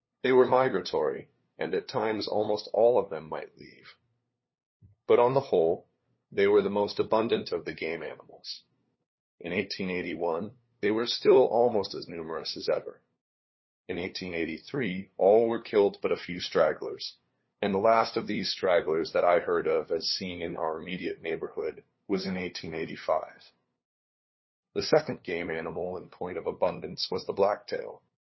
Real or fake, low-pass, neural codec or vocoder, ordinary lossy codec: fake; 7.2 kHz; codec, 16 kHz, 2 kbps, FunCodec, trained on LibriTTS, 25 frames a second; MP3, 24 kbps